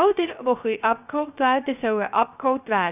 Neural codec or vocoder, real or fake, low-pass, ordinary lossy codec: codec, 16 kHz, 0.3 kbps, FocalCodec; fake; 3.6 kHz; none